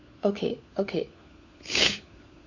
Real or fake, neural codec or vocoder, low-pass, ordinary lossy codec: fake; codec, 16 kHz, 4 kbps, FunCodec, trained on LibriTTS, 50 frames a second; 7.2 kHz; none